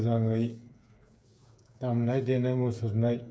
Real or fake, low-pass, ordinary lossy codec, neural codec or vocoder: fake; none; none; codec, 16 kHz, 8 kbps, FreqCodec, smaller model